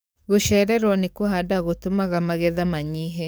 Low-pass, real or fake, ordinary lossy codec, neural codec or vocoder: none; fake; none; codec, 44.1 kHz, 7.8 kbps, DAC